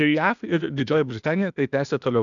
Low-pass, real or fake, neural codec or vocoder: 7.2 kHz; fake; codec, 16 kHz, 0.8 kbps, ZipCodec